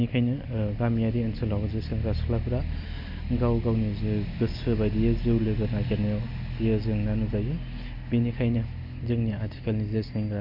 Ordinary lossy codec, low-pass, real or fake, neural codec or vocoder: none; 5.4 kHz; real; none